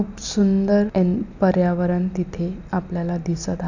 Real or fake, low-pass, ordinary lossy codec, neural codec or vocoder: real; 7.2 kHz; none; none